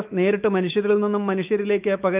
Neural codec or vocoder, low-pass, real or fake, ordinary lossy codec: autoencoder, 48 kHz, 128 numbers a frame, DAC-VAE, trained on Japanese speech; 3.6 kHz; fake; Opus, 24 kbps